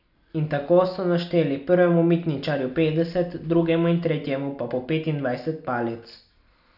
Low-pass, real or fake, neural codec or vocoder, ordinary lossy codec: 5.4 kHz; real; none; none